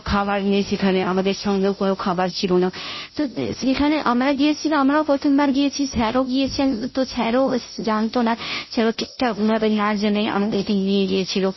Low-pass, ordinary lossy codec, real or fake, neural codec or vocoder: 7.2 kHz; MP3, 24 kbps; fake; codec, 16 kHz, 0.5 kbps, FunCodec, trained on Chinese and English, 25 frames a second